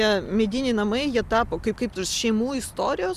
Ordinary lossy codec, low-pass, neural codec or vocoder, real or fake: Opus, 64 kbps; 14.4 kHz; none; real